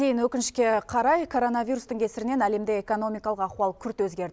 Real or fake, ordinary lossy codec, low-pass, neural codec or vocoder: real; none; none; none